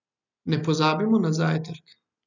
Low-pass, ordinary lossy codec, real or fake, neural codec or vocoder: 7.2 kHz; none; real; none